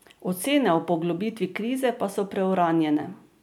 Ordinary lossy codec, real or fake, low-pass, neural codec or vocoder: none; real; 19.8 kHz; none